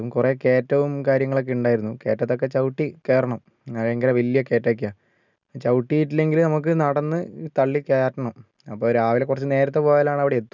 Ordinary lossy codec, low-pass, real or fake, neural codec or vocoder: none; 7.2 kHz; real; none